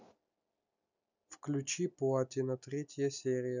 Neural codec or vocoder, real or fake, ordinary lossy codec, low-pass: none; real; none; 7.2 kHz